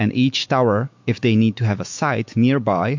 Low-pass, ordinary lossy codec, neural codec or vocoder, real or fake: 7.2 kHz; MP3, 48 kbps; autoencoder, 48 kHz, 128 numbers a frame, DAC-VAE, trained on Japanese speech; fake